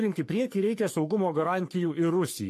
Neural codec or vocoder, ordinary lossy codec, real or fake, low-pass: codec, 44.1 kHz, 3.4 kbps, Pupu-Codec; AAC, 64 kbps; fake; 14.4 kHz